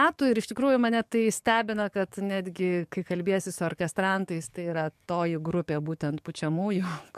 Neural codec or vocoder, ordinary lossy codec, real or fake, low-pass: codec, 44.1 kHz, 7.8 kbps, DAC; MP3, 96 kbps; fake; 14.4 kHz